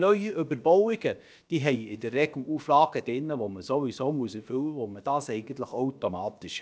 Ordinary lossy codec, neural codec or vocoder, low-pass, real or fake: none; codec, 16 kHz, about 1 kbps, DyCAST, with the encoder's durations; none; fake